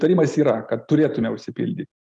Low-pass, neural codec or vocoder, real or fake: 10.8 kHz; none; real